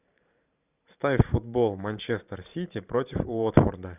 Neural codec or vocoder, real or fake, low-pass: vocoder, 44.1 kHz, 128 mel bands every 512 samples, BigVGAN v2; fake; 3.6 kHz